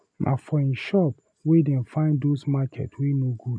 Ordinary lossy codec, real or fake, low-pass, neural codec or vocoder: none; real; 9.9 kHz; none